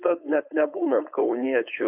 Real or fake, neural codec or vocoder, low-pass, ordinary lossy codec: fake; codec, 16 kHz, 4.8 kbps, FACodec; 3.6 kHz; AAC, 32 kbps